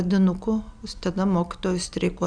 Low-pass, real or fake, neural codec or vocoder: 9.9 kHz; real; none